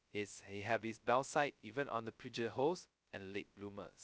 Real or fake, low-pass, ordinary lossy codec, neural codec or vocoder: fake; none; none; codec, 16 kHz, 0.2 kbps, FocalCodec